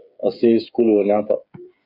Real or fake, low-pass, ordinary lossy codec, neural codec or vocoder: fake; 5.4 kHz; AAC, 48 kbps; codec, 16 kHz, 8 kbps, FreqCodec, smaller model